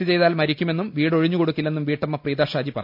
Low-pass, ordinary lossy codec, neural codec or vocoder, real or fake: 5.4 kHz; MP3, 48 kbps; none; real